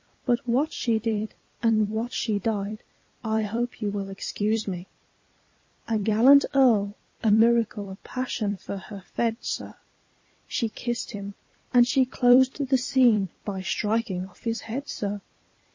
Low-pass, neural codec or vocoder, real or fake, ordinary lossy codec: 7.2 kHz; vocoder, 44.1 kHz, 128 mel bands every 256 samples, BigVGAN v2; fake; MP3, 32 kbps